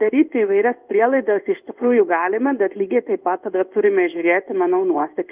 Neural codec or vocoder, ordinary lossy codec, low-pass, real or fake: codec, 16 kHz in and 24 kHz out, 1 kbps, XY-Tokenizer; Opus, 24 kbps; 3.6 kHz; fake